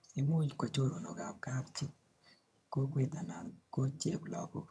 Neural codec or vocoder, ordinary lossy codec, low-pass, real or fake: vocoder, 22.05 kHz, 80 mel bands, HiFi-GAN; none; none; fake